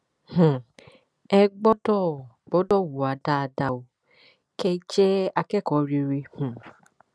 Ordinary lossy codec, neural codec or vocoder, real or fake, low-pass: none; none; real; none